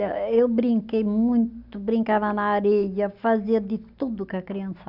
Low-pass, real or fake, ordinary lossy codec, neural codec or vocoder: 5.4 kHz; real; none; none